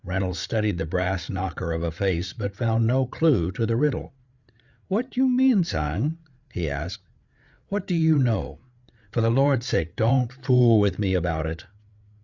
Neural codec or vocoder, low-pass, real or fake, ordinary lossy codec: codec, 16 kHz, 8 kbps, FreqCodec, larger model; 7.2 kHz; fake; Opus, 64 kbps